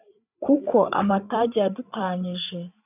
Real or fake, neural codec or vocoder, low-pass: fake; codec, 16 kHz, 8 kbps, FreqCodec, larger model; 3.6 kHz